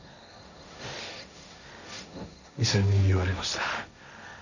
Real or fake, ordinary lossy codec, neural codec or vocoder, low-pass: fake; none; codec, 16 kHz, 1.1 kbps, Voila-Tokenizer; 7.2 kHz